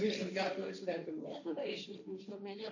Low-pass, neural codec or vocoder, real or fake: 7.2 kHz; codec, 16 kHz, 1.1 kbps, Voila-Tokenizer; fake